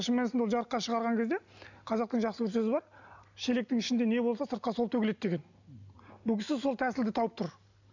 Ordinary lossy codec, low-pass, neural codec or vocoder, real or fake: none; 7.2 kHz; none; real